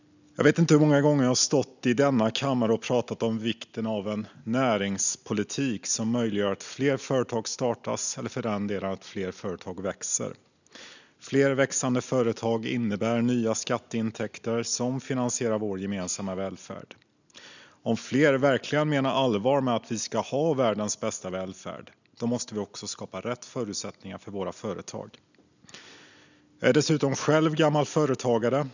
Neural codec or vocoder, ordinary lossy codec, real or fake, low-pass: none; none; real; 7.2 kHz